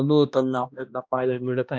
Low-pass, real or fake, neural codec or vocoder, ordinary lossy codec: none; fake; codec, 16 kHz, 1 kbps, X-Codec, HuBERT features, trained on balanced general audio; none